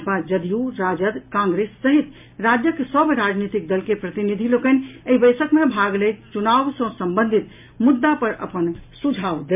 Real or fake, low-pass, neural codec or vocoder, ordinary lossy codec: real; 3.6 kHz; none; MP3, 32 kbps